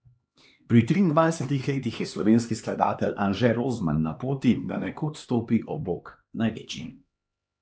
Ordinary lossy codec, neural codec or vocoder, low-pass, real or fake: none; codec, 16 kHz, 2 kbps, X-Codec, HuBERT features, trained on LibriSpeech; none; fake